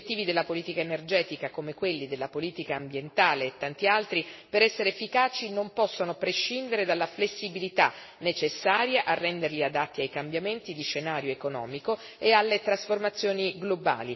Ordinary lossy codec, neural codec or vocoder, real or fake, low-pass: MP3, 24 kbps; none; real; 7.2 kHz